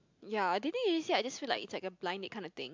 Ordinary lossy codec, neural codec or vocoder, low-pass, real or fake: MP3, 64 kbps; none; 7.2 kHz; real